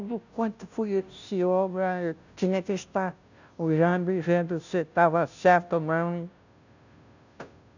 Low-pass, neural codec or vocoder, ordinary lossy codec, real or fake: 7.2 kHz; codec, 16 kHz, 0.5 kbps, FunCodec, trained on Chinese and English, 25 frames a second; none; fake